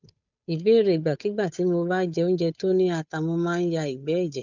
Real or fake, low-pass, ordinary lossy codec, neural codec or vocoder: fake; 7.2 kHz; none; codec, 16 kHz, 8 kbps, FunCodec, trained on Chinese and English, 25 frames a second